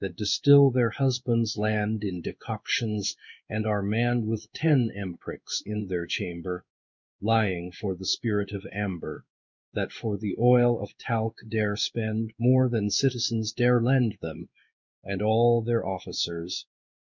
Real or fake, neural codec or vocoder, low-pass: fake; codec, 16 kHz in and 24 kHz out, 1 kbps, XY-Tokenizer; 7.2 kHz